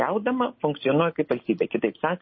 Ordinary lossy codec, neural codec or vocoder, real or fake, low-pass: MP3, 24 kbps; none; real; 7.2 kHz